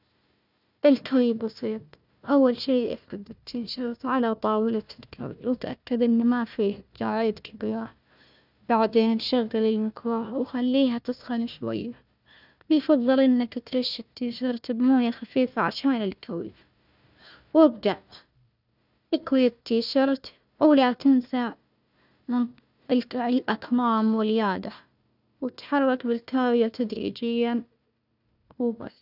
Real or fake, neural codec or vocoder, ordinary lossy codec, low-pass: fake; codec, 16 kHz, 1 kbps, FunCodec, trained on Chinese and English, 50 frames a second; none; 5.4 kHz